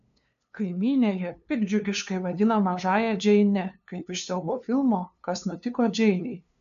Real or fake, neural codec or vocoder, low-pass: fake; codec, 16 kHz, 2 kbps, FunCodec, trained on LibriTTS, 25 frames a second; 7.2 kHz